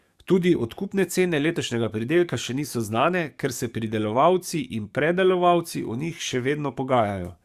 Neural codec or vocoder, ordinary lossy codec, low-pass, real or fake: codec, 44.1 kHz, 7.8 kbps, DAC; Opus, 64 kbps; 14.4 kHz; fake